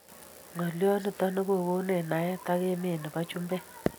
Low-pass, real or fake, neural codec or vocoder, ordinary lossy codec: none; real; none; none